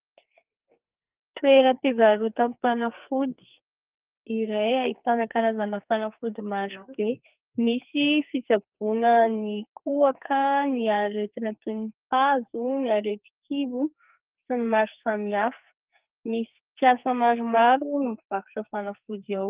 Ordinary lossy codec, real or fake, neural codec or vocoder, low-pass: Opus, 32 kbps; fake; codec, 44.1 kHz, 2.6 kbps, SNAC; 3.6 kHz